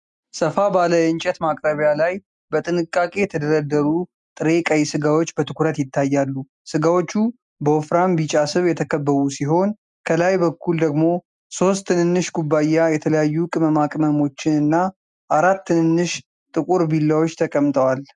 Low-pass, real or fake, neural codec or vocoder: 10.8 kHz; real; none